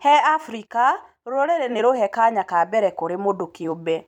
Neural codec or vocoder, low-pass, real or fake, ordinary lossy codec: vocoder, 44.1 kHz, 128 mel bands every 256 samples, BigVGAN v2; 19.8 kHz; fake; none